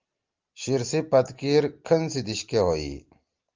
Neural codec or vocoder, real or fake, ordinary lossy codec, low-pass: none; real; Opus, 32 kbps; 7.2 kHz